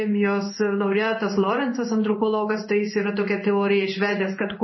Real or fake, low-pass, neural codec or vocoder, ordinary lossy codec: fake; 7.2 kHz; codec, 16 kHz in and 24 kHz out, 1 kbps, XY-Tokenizer; MP3, 24 kbps